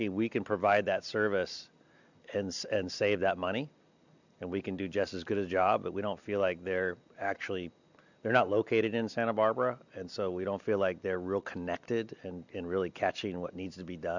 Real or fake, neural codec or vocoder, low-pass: real; none; 7.2 kHz